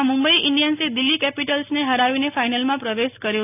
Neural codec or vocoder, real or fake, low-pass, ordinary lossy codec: none; real; 3.6 kHz; none